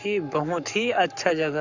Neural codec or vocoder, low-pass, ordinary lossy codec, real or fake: codec, 44.1 kHz, 7.8 kbps, Pupu-Codec; 7.2 kHz; none; fake